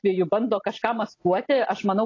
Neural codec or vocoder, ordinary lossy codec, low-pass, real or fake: none; AAC, 32 kbps; 7.2 kHz; real